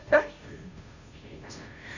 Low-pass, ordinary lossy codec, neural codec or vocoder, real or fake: 7.2 kHz; none; codec, 16 kHz, 0.5 kbps, FunCodec, trained on Chinese and English, 25 frames a second; fake